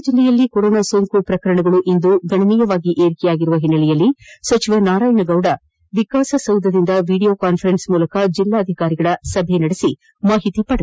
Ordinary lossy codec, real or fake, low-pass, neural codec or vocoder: none; real; none; none